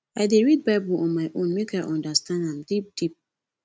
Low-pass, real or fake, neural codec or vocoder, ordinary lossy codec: none; real; none; none